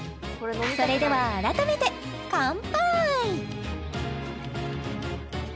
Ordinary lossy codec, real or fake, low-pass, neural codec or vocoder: none; real; none; none